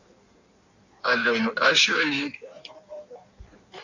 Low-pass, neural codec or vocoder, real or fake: 7.2 kHz; codec, 16 kHz in and 24 kHz out, 1.1 kbps, FireRedTTS-2 codec; fake